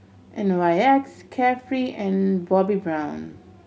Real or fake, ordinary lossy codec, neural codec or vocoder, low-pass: real; none; none; none